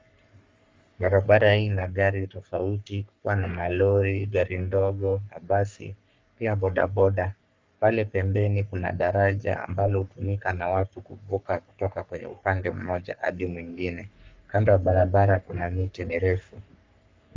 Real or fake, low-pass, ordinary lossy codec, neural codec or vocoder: fake; 7.2 kHz; Opus, 32 kbps; codec, 44.1 kHz, 3.4 kbps, Pupu-Codec